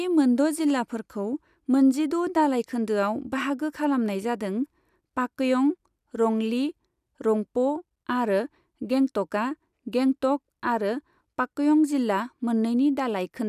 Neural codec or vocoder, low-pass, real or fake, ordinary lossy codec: none; 14.4 kHz; real; none